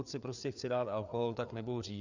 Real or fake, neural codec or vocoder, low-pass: fake; codec, 16 kHz, 4 kbps, FreqCodec, larger model; 7.2 kHz